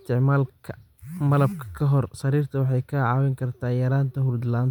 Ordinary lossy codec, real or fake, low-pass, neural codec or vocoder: none; real; 19.8 kHz; none